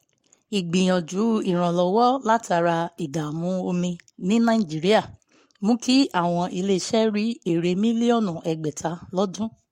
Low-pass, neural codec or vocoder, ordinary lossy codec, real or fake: 19.8 kHz; codec, 44.1 kHz, 7.8 kbps, Pupu-Codec; MP3, 64 kbps; fake